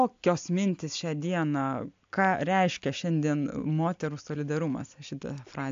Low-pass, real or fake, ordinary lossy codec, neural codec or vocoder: 7.2 kHz; real; AAC, 64 kbps; none